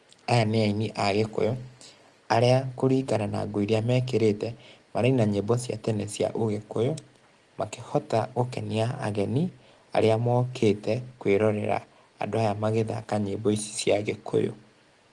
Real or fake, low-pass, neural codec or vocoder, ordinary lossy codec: real; 10.8 kHz; none; Opus, 24 kbps